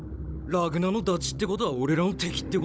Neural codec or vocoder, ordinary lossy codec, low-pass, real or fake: codec, 16 kHz, 16 kbps, FunCodec, trained on Chinese and English, 50 frames a second; none; none; fake